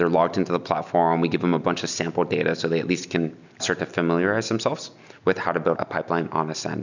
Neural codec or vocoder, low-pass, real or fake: none; 7.2 kHz; real